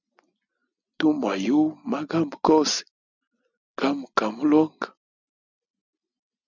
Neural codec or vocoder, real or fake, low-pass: none; real; 7.2 kHz